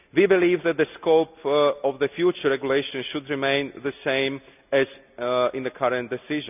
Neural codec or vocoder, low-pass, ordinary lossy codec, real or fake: none; 3.6 kHz; none; real